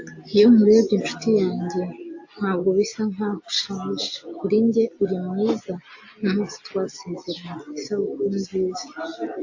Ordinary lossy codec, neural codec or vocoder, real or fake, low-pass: AAC, 48 kbps; none; real; 7.2 kHz